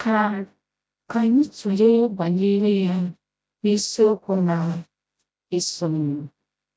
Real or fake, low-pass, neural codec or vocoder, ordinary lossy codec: fake; none; codec, 16 kHz, 0.5 kbps, FreqCodec, smaller model; none